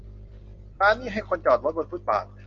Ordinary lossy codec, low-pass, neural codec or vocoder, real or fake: Opus, 32 kbps; 7.2 kHz; none; real